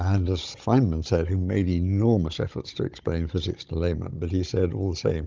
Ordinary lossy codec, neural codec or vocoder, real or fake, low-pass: Opus, 32 kbps; codec, 16 kHz, 16 kbps, FunCodec, trained on Chinese and English, 50 frames a second; fake; 7.2 kHz